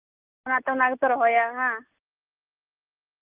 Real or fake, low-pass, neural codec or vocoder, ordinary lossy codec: real; 3.6 kHz; none; Opus, 24 kbps